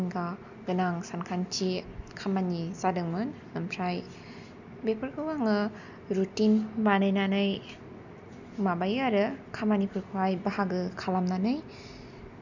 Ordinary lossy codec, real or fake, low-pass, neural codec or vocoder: none; real; 7.2 kHz; none